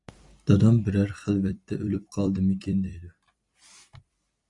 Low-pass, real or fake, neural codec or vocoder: 10.8 kHz; fake; vocoder, 44.1 kHz, 128 mel bands every 256 samples, BigVGAN v2